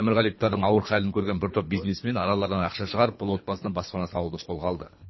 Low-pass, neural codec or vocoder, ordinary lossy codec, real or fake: 7.2 kHz; codec, 24 kHz, 3 kbps, HILCodec; MP3, 24 kbps; fake